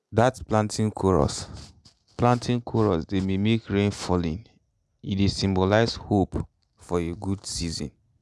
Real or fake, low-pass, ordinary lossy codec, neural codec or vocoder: real; none; none; none